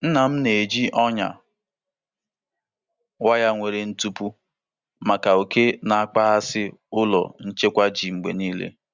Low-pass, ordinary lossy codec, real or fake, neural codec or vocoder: 7.2 kHz; Opus, 64 kbps; real; none